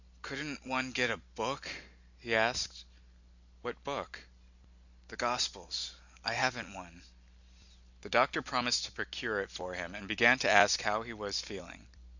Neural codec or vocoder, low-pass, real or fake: none; 7.2 kHz; real